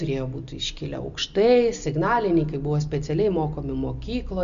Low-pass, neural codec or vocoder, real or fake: 7.2 kHz; none; real